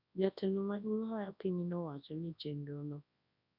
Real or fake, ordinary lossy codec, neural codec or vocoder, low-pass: fake; none; codec, 24 kHz, 0.9 kbps, WavTokenizer, large speech release; 5.4 kHz